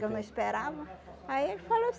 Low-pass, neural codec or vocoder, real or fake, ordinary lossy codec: none; none; real; none